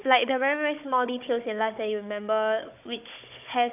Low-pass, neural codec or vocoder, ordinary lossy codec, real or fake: 3.6 kHz; codec, 16 kHz, 16 kbps, FunCodec, trained on Chinese and English, 50 frames a second; AAC, 32 kbps; fake